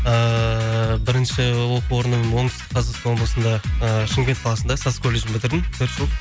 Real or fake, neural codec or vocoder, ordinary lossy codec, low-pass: real; none; none; none